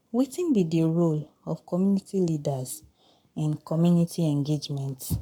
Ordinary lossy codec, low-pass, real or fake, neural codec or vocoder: none; 19.8 kHz; fake; codec, 44.1 kHz, 7.8 kbps, Pupu-Codec